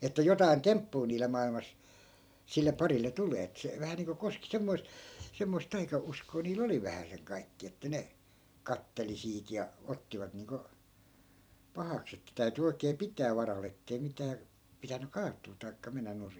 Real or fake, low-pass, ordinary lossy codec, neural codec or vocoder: fake; none; none; vocoder, 44.1 kHz, 128 mel bands every 256 samples, BigVGAN v2